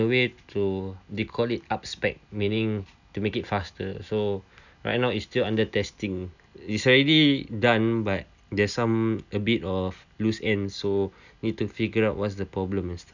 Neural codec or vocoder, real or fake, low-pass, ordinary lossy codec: none; real; 7.2 kHz; none